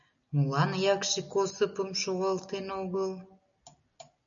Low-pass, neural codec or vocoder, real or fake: 7.2 kHz; none; real